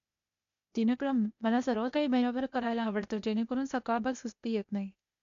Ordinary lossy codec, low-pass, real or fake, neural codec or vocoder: none; 7.2 kHz; fake; codec, 16 kHz, 0.8 kbps, ZipCodec